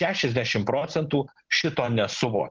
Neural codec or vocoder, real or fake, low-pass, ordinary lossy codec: none; real; 7.2 kHz; Opus, 16 kbps